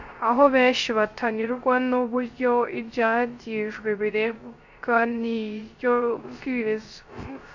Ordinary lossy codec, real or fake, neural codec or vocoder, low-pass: Opus, 64 kbps; fake; codec, 16 kHz, 0.3 kbps, FocalCodec; 7.2 kHz